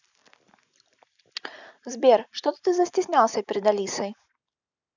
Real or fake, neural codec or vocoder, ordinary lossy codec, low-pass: real; none; none; 7.2 kHz